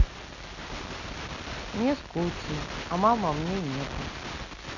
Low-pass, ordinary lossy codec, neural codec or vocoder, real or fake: 7.2 kHz; none; none; real